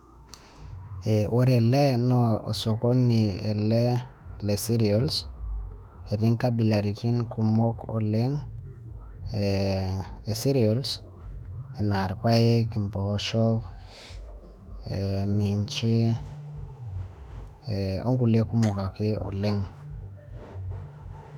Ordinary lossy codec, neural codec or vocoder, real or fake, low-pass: none; autoencoder, 48 kHz, 32 numbers a frame, DAC-VAE, trained on Japanese speech; fake; 19.8 kHz